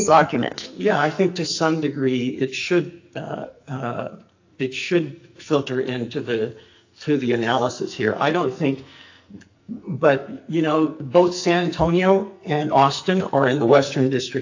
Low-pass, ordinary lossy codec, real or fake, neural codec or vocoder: 7.2 kHz; AAC, 48 kbps; fake; codec, 44.1 kHz, 2.6 kbps, SNAC